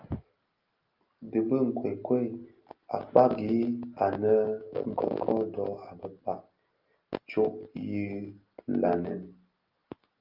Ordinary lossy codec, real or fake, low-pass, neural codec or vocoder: Opus, 32 kbps; real; 5.4 kHz; none